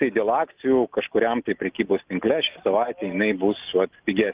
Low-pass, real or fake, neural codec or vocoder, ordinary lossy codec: 3.6 kHz; real; none; Opus, 32 kbps